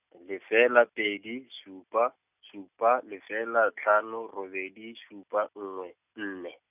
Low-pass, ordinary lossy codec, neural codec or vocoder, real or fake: 3.6 kHz; none; none; real